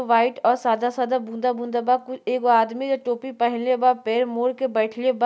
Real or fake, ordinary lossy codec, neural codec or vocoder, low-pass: real; none; none; none